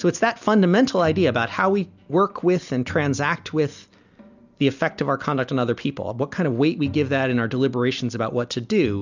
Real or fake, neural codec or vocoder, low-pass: real; none; 7.2 kHz